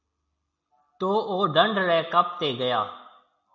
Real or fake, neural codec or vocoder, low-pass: real; none; 7.2 kHz